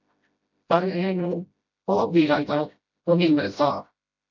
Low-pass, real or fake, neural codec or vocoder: 7.2 kHz; fake; codec, 16 kHz, 0.5 kbps, FreqCodec, smaller model